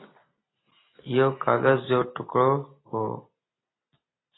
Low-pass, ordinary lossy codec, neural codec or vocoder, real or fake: 7.2 kHz; AAC, 16 kbps; none; real